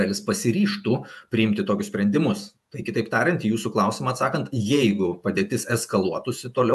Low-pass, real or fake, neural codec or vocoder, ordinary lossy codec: 14.4 kHz; fake; vocoder, 48 kHz, 128 mel bands, Vocos; AAC, 96 kbps